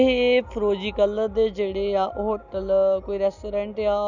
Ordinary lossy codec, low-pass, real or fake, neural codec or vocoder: none; 7.2 kHz; real; none